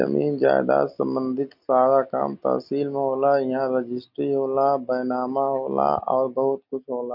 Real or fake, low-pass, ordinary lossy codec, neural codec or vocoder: real; 5.4 kHz; none; none